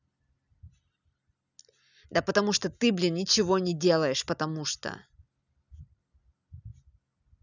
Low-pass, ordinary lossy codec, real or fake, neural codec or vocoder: 7.2 kHz; none; real; none